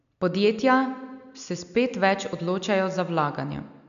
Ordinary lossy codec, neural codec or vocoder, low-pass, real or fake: none; none; 7.2 kHz; real